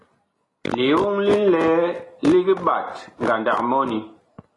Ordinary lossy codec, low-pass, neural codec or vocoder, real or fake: AAC, 32 kbps; 10.8 kHz; none; real